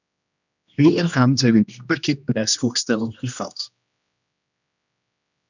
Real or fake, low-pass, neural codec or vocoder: fake; 7.2 kHz; codec, 16 kHz, 1 kbps, X-Codec, HuBERT features, trained on general audio